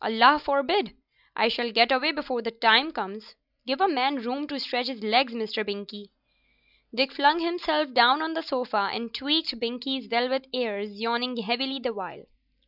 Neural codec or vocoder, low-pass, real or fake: none; 5.4 kHz; real